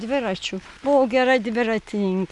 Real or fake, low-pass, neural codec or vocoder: real; 10.8 kHz; none